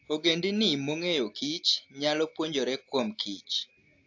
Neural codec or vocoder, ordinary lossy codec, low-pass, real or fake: none; AAC, 48 kbps; 7.2 kHz; real